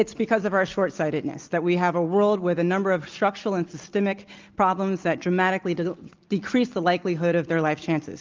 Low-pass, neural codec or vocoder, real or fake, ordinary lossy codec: 7.2 kHz; none; real; Opus, 24 kbps